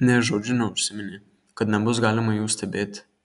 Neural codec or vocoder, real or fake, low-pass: none; real; 10.8 kHz